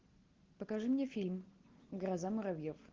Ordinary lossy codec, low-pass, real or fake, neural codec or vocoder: Opus, 16 kbps; 7.2 kHz; real; none